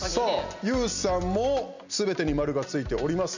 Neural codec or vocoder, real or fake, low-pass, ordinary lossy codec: none; real; 7.2 kHz; none